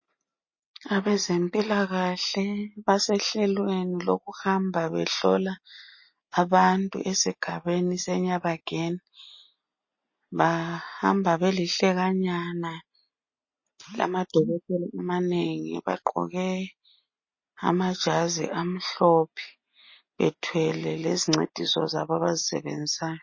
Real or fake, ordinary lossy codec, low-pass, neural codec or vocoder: real; MP3, 32 kbps; 7.2 kHz; none